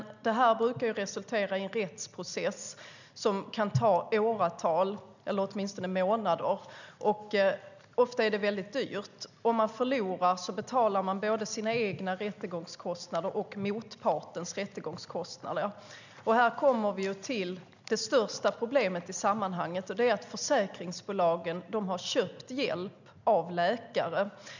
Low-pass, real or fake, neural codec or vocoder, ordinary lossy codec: 7.2 kHz; real; none; none